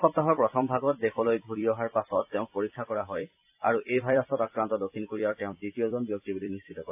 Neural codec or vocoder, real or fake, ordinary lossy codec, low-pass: vocoder, 44.1 kHz, 128 mel bands every 256 samples, BigVGAN v2; fake; AAC, 32 kbps; 3.6 kHz